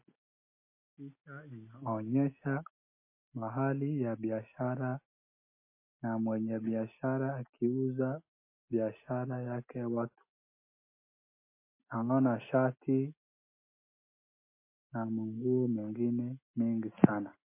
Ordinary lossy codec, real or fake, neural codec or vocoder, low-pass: MP3, 24 kbps; real; none; 3.6 kHz